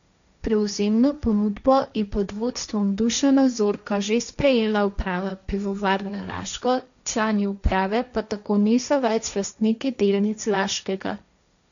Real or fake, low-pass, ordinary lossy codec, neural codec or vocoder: fake; 7.2 kHz; none; codec, 16 kHz, 1.1 kbps, Voila-Tokenizer